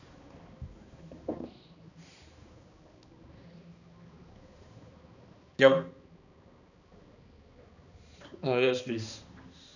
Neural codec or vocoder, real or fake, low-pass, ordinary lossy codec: codec, 16 kHz, 2 kbps, X-Codec, HuBERT features, trained on balanced general audio; fake; 7.2 kHz; none